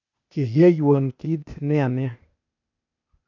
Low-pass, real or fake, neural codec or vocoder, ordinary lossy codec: 7.2 kHz; fake; codec, 16 kHz, 0.8 kbps, ZipCodec; none